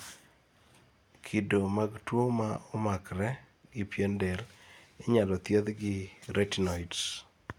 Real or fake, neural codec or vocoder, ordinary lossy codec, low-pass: real; none; none; 19.8 kHz